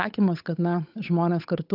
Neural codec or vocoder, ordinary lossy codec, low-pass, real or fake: codec, 16 kHz, 8 kbps, FunCodec, trained on Chinese and English, 25 frames a second; AAC, 48 kbps; 5.4 kHz; fake